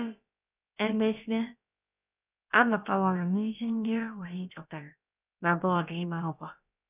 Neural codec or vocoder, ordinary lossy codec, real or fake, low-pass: codec, 16 kHz, about 1 kbps, DyCAST, with the encoder's durations; none; fake; 3.6 kHz